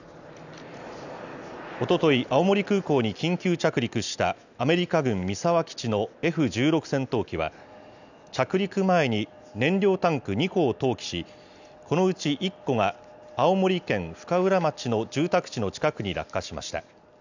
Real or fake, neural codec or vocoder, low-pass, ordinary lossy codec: real; none; 7.2 kHz; none